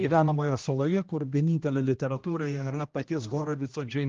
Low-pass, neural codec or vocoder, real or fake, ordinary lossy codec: 7.2 kHz; codec, 16 kHz, 1 kbps, X-Codec, HuBERT features, trained on general audio; fake; Opus, 32 kbps